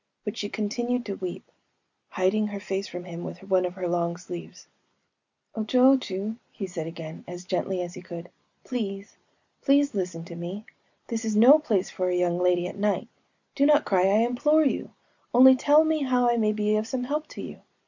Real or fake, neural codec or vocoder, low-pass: real; none; 7.2 kHz